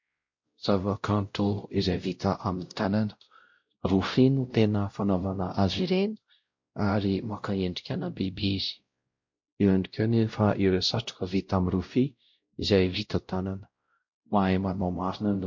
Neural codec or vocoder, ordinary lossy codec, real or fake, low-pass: codec, 16 kHz, 0.5 kbps, X-Codec, WavLM features, trained on Multilingual LibriSpeech; MP3, 48 kbps; fake; 7.2 kHz